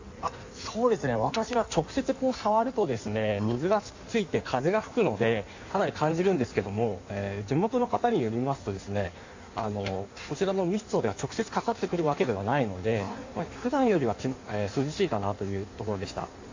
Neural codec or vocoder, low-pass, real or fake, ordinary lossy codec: codec, 16 kHz in and 24 kHz out, 1.1 kbps, FireRedTTS-2 codec; 7.2 kHz; fake; AAC, 48 kbps